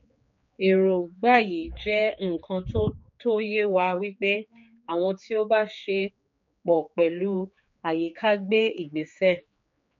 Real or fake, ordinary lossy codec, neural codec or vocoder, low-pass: fake; MP3, 48 kbps; codec, 16 kHz, 4 kbps, X-Codec, HuBERT features, trained on general audio; 7.2 kHz